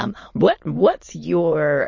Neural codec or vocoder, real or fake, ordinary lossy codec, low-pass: autoencoder, 22.05 kHz, a latent of 192 numbers a frame, VITS, trained on many speakers; fake; MP3, 32 kbps; 7.2 kHz